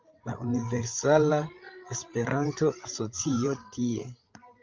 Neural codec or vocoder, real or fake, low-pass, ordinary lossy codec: codec, 16 kHz, 16 kbps, FreqCodec, larger model; fake; 7.2 kHz; Opus, 24 kbps